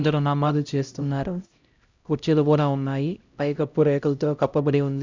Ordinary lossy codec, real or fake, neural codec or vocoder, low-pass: Opus, 64 kbps; fake; codec, 16 kHz, 0.5 kbps, X-Codec, HuBERT features, trained on LibriSpeech; 7.2 kHz